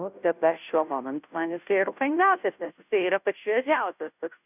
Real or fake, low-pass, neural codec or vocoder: fake; 3.6 kHz; codec, 16 kHz, 0.5 kbps, FunCodec, trained on Chinese and English, 25 frames a second